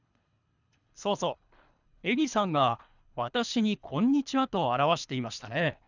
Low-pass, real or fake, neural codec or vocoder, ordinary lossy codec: 7.2 kHz; fake; codec, 24 kHz, 3 kbps, HILCodec; none